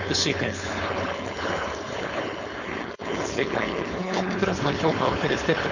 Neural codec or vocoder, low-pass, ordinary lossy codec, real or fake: codec, 16 kHz, 4.8 kbps, FACodec; 7.2 kHz; none; fake